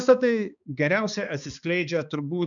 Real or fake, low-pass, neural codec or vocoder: fake; 7.2 kHz; codec, 16 kHz, 2 kbps, X-Codec, HuBERT features, trained on balanced general audio